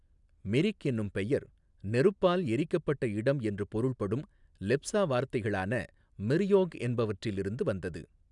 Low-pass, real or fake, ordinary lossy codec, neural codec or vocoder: 10.8 kHz; real; none; none